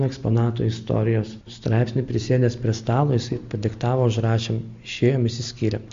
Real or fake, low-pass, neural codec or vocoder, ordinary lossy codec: real; 7.2 kHz; none; MP3, 48 kbps